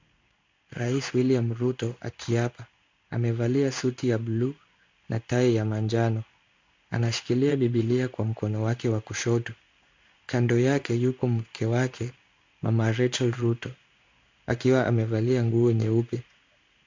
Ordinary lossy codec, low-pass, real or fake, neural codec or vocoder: MP3, 64 kbps; 7.2 kHz; fake; codec, 16 kHz in and 24 kHz out, 1 kbps, XY-Tokenizer